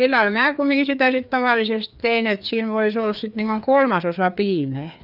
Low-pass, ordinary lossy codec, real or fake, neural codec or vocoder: 5.4 kHz; none; fake; codec, 16 kHz, 2 kbps, FreqCodec, larger model